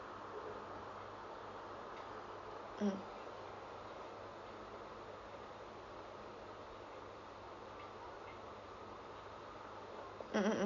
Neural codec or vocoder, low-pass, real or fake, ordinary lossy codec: none; 7.2 kHz; real; MP3, 64 kbps